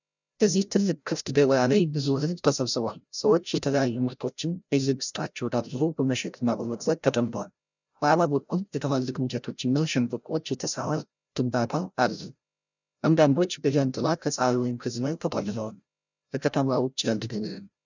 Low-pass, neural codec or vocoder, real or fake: 7.2 kHz; codec, 16 kHz, 0.5 kbps, FreqCodec, larger model; fake